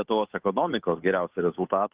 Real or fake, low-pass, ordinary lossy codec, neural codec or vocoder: fake; 3.6 kHz; Opus, 24 kbps; codec, 16 kHz, 8 kbps, FunCodec, trained on Chinese and English, 25 frames a second